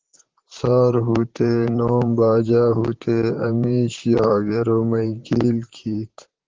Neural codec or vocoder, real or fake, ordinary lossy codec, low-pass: vocoder, 44.1 kHz, 128 mel bands, Pupu-Vocoder; fake; Opus, 16 kbps; 7.2 kHz